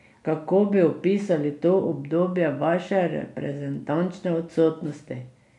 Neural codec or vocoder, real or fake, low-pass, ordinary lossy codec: none; real; 10.8 kHz; none